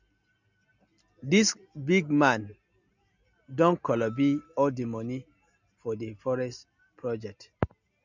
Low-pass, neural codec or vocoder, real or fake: 7.2 kHz; none; real